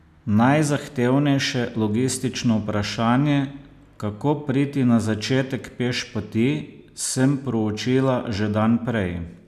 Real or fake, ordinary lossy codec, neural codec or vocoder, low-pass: real; none; none; 14.4 kHz